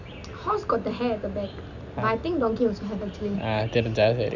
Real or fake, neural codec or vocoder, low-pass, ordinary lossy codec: real; none; 7.2 kHz; none